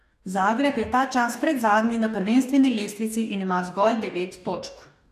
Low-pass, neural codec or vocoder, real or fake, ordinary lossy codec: 14.4 kHz; codec, 44.1 kHz, 2.6 kbps, DAC; fake; none